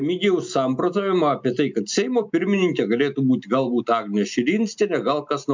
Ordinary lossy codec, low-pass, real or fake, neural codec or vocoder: MP3, 64 kbps; 7.2 kHz; real; none